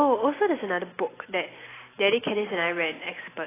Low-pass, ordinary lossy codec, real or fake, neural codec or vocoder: 3.6 kHz; AAC, 16 kbps; real; none